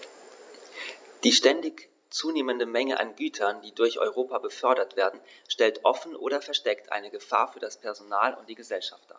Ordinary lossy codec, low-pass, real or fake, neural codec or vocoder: none; none; real; none